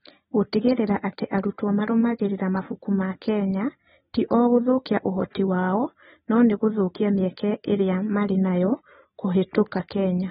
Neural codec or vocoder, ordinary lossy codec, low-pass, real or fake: none; AAC, 16 kbps; 19.8 kHz; real